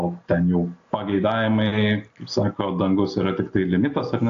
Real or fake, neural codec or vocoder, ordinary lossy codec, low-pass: real; none; AAC, 48 kbps; 7.2 kHz